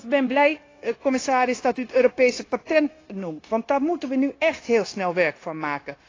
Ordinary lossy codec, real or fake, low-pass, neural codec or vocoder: AAC, 32 kbps; fake; 7.2 kHz; codec, 16 kHz, 0.9 kbps, LongCat-Audio-Codec